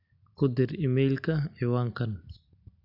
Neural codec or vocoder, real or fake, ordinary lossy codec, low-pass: none; real; AAC, 48 kbps; 5.4 kHz